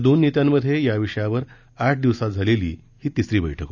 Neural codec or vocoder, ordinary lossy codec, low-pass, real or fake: none; none; 7.2 kHz; real